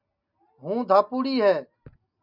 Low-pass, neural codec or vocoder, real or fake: 5.4 kHz; none; real